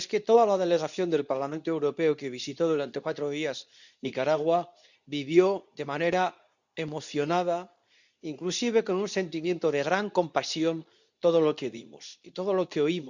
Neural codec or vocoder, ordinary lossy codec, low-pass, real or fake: codec, 24 kHz, 0.9 kbps, WavTokenizer, medium speech release version 2; none; 7.2 kHz; fake